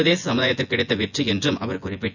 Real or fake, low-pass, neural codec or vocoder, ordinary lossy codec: fake; 7.2 kHz; vocoder, 24 kHz, 100 mel bands, Vocos; none